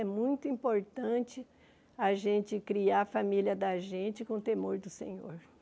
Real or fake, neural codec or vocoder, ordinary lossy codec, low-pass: real; none; none; none